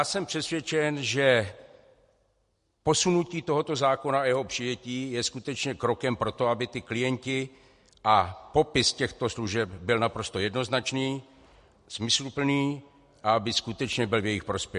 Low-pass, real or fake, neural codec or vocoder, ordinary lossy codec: 14.4 kHz; real; none; MP3, 48 kbps